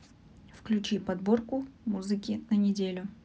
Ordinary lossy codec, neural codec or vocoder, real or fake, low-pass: none; none; real; none